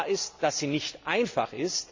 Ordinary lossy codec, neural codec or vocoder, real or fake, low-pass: MP3, 64 kbps; none; real; 7.2 kHz